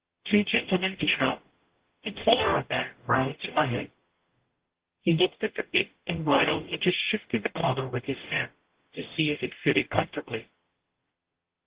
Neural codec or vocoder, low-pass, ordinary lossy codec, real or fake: codec, 44.1 kHz, 0.9 kbps, DAC; 3.6 kHz; Opus, 16 kbps; fake